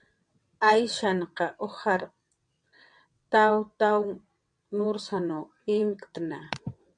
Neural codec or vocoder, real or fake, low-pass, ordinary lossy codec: vocoder, 22.05 kHz, 80 mel bands, WaveNeXt; fake; 9.9 kHz; MP3, 64 kbps